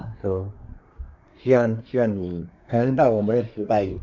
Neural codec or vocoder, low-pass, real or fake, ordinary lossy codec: codec, 24 kHz, 1 kbps, SNAC; 7.2 kHz; fake; none